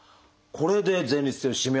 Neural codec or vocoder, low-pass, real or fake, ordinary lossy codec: none; none; real; none